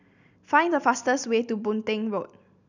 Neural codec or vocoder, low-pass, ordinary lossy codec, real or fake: none; 7.2 kHz; none; real